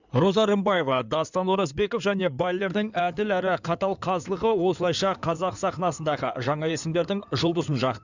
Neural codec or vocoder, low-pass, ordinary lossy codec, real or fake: codec, 16 kHz in and 24 kHz out, 2.2 kbps, FireRedTTS-2 codec; 7.2 kHz; none; fake